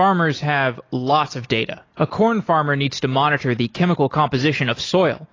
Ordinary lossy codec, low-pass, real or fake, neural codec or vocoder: AAC, 32 kbps; 7.2 kHz; real; none